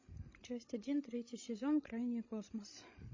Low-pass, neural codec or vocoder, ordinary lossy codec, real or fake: 7.2 kHz; codec, 16 kHz, 16 kbps, FreqCodec, larger model; MP3, 32 kbps; fake